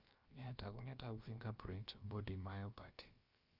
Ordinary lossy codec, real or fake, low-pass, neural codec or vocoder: Opus, 32 kbps; fake; 5.4 kHz; codec, 16 kHz, about 1 kbps, DyCAST, with the encoder's durations